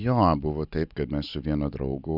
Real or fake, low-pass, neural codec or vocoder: real; 5.4 kHz; none